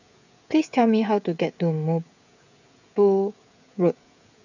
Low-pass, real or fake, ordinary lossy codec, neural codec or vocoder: 7.2 kHz; fake; none; codec, 16 kHz, 16 kbps, FreqCodec, smaller model